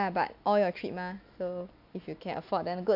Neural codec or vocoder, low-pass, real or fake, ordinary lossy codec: none; 5.4 kHz; real; AAC, 48 kbps